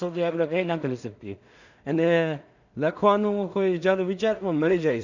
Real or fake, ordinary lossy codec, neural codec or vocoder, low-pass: fake; none; codec, 16 kHz in and 24 kHz out, 0.4 kbps, LongCat-Audio-Codec, two codebook decoder; 7.2 kHz